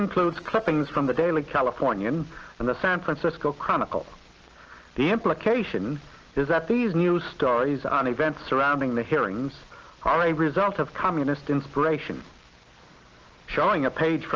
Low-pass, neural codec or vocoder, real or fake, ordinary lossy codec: 7.2 kHz; none; real; Opus, 16 kbps